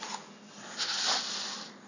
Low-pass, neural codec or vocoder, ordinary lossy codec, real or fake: 7.2 kHz; none; none; real